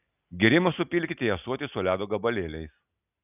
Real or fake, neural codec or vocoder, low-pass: real; none; 3.6 kHz